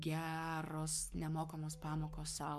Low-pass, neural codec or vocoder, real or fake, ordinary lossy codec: 14.4 kHz; codec, 44.1 kHz, 7.8 kbps, Pupu-Codec; fake; MP3, 96 kbps